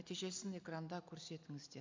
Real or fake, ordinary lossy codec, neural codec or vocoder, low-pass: real; MP3, 64 kbps; none; 7.2 kHz